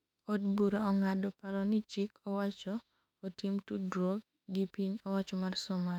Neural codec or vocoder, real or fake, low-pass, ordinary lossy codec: autoencoder, 48 kHz, 32 numbers a frame, DAC-VAE, trained on Japanese speech; fake; 19.8 kHz; none